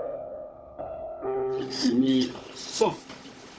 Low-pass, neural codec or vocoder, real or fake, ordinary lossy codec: none; codec, 16 kHz, 16 kbps, FunCodec, trained on Chinese and English, 50 frames a second; fake; none